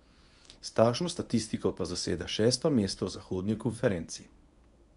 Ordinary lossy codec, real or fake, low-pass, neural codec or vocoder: none; fake; 10.8 kHz; codec, 24 kHz, 0.9 kbps, WavTokenizer, medium speech release version 1